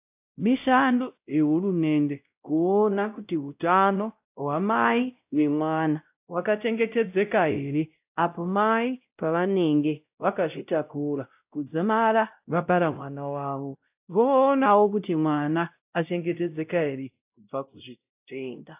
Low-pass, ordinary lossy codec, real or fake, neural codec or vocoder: 3.6 kHz; AAC, 32 kbps; fake; codec, 16 kHz, 0.5 kbps, X-Codec, WavLM features, trained on Multilingual LibriSpeech